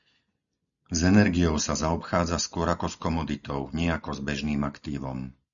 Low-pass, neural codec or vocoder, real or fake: 7.2 kHz; none; real